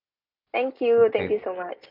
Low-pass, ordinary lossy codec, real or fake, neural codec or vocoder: 5.4 kHz; none; real; none